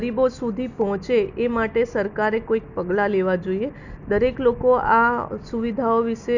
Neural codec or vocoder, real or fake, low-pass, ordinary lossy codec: none; real; 7.2 kHz; none